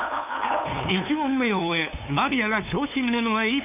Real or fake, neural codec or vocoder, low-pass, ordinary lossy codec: fake; codec, 16 kHz, 2 kbps, FunCodec, trained on LibriTTS, 25 frames a second; 3.6 kHz; none